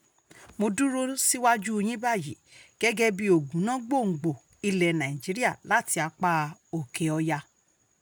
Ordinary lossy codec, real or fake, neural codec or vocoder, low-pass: none; real; none; none